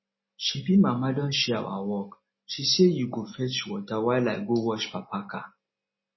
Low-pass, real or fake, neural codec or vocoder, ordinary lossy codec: 7.2 kHz; real; none; MP3, 24 kbps